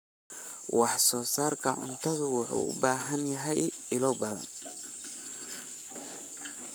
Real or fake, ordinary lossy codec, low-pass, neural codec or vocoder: fake; none; none; codec, 44.1 kHz, 7.8 kbps, Pupu-Codec